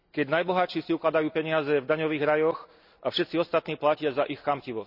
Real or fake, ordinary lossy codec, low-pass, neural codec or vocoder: real; none; 5.4 kHz; none